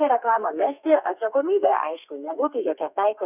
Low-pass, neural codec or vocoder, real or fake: 3.6 kHz; codec, 32 kHz, 1.9 kbps, SNAC; fake